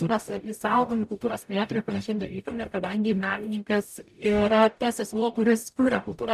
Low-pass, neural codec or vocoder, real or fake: 14.4 kHz; codec, 44.1 kHz, 0.9 kbps, DAC; fake